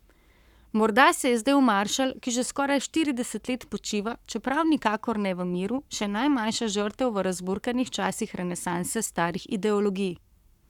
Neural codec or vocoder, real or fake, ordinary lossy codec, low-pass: codec, 44.1 kHz, 7.8 kbps, Pupu-Codec; fake; none; 19.8 kHz